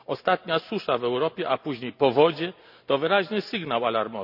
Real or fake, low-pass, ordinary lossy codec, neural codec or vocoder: real; 5.4 kHz; none; none